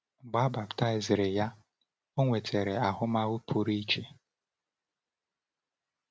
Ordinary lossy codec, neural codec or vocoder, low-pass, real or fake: none; none; none; real